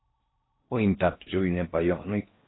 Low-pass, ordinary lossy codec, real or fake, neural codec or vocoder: 7.2 kHz; AAC, 16 kbps; fake; codec, 16 kHz in and 24 kHz out, 0.6 kbps, FocalCodec, streaming, 4096 codes